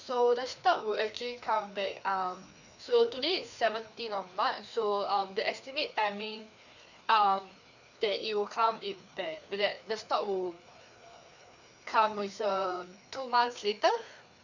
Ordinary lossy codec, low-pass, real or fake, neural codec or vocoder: none; 7.2 kHz; fake; codec, 16 kHz, 2 kbps, FreqCodec, larger model